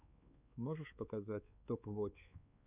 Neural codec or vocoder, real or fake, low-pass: codec, 16 kHz, 4 kbps, X-Codec, WavLM features, trained on Multilingual LibriSpeech; fake; 3.6 kHz